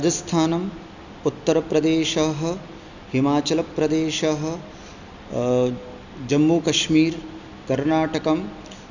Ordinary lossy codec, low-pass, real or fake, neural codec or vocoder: none; 7.2 kHz; real; none